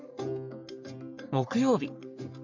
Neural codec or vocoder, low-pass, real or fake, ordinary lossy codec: codec, 44.1 kHz, 3.4 kbps, Pupu-Codec; 7.2 kHz; fake; none